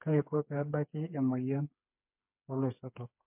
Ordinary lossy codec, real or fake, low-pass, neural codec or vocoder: MP3, 32 kbps; fake; 3.6 kHz; codec, 16 kHz, 4 kbps, FreqCodec, smaller model